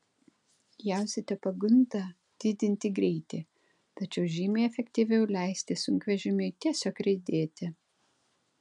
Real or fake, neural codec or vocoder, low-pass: real; none; 10.8 kHz